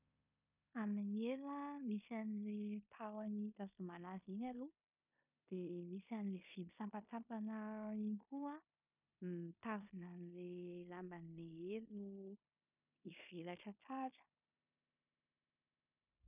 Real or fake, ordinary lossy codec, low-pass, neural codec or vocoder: fake; none; 3.6 kHz; codec, 16 kHz in and 24 kHz out, 0.9 kbps, LongCat-Audio-Codec, four codebook decoder